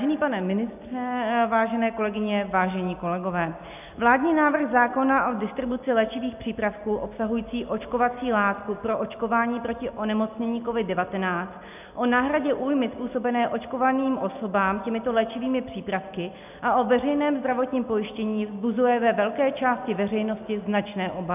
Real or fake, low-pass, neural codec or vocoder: real; 3.6 kHz; none